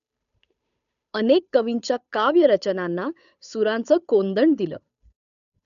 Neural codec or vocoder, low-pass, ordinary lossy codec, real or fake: codec, 16 kHz, 8 kbps, FunCodec, trained on Chinese and English, 25 frames a second; 7.2 kHz; none; fake